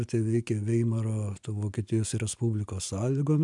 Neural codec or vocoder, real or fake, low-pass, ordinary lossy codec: none; real; 10.8 kHz; MP3, 96 kbps